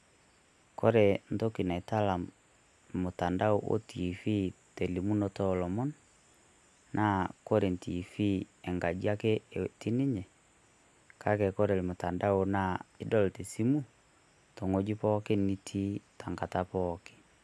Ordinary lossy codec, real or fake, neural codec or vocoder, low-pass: none; real; none; none